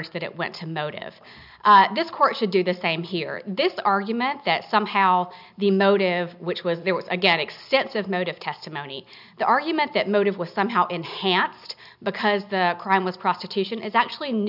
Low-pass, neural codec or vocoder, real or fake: 5.4 kHz; none; real